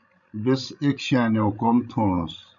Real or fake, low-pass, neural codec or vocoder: fake; 7.2 kHz; codec, 16 kHz, 16 kbps, FreqCodec, larger model